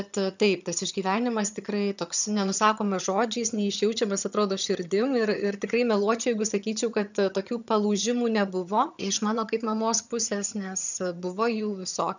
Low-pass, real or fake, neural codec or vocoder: 7.2 kHz; fake; vocoder, 22.05 kHz, 80 mel bands, HiFi-GAN